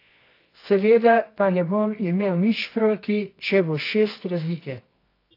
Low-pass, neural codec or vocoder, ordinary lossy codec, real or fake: 5.4 kHz; codec, 24 kHz, 0.9 kbps, WavTokenizer, medium music audio release; AAC, 32 kbps; fake